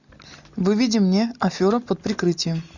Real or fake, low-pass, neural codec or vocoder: real; 7.2 kHz; none